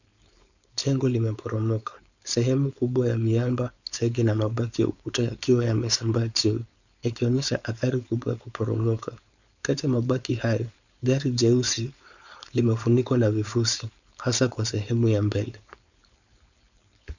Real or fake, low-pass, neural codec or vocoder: fake; 7.2 kHz; codec, 16 kHz, 4.8 kbps, FACodec